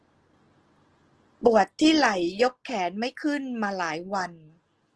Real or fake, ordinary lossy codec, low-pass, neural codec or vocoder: real; Opus, 16 kbps; 10.8 kHz; none